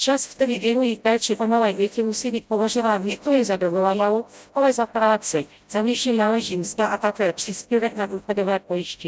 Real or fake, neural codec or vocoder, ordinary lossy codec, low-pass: fake; codec, 16 kHz, 0.5 kbps, FreqCodec, smaller model; none; none